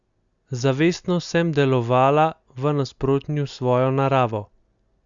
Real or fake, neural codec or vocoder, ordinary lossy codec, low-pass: real; none; Opus, 64 kbps; 7.2 kHz